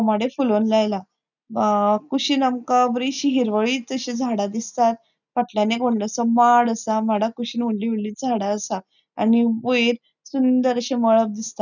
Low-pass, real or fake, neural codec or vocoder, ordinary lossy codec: 7.2 kHz; real; none; none